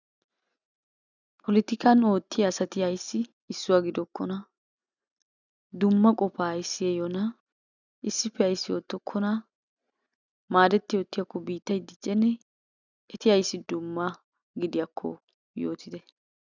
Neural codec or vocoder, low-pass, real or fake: none; 7.2 kHz; real